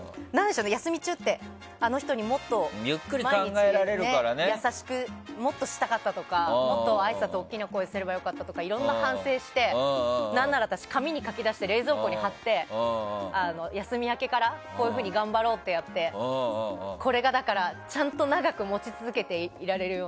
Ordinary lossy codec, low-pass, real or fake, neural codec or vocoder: none; none; real; none